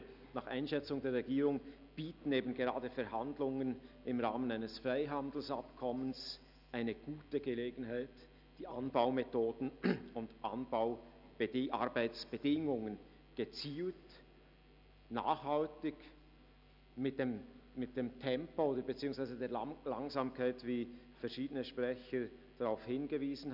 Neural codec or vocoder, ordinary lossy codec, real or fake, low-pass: none; none; real; 5.4 kHz